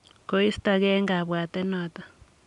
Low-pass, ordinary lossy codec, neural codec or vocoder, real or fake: 10.8 kHz; none; none; real